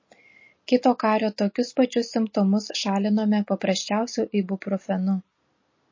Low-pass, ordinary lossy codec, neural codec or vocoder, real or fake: 7.2 kHz; MP3, 32 kbps; none; real